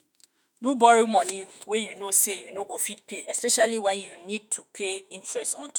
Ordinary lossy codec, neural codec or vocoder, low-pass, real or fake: none; autoencoder, 48 kHz, 32 numbers a frame, DAC-VAE, trained on Japanese speech; none; fake